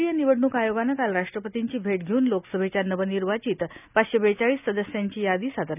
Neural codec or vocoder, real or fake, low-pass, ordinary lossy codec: none; real; 3.6 kHz; none